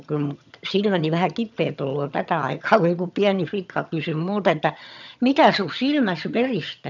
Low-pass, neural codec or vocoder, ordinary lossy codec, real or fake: 7.2 kHz; vocoder, 22.05 kHz, 80 mel bands, HiFi-GAN; none; fake